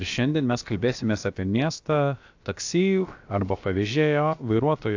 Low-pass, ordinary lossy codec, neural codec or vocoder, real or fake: 7.2 kHz; AAC, 48 kbps; codec, 16 kHz, about 1 kbps, DyCAST, with the encoder's durations; fake